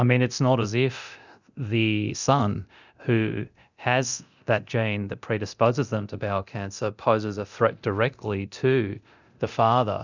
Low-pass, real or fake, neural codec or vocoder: 7.2 kHz; fake; codec, 24 kHz, 0.9 kbps, DualCodec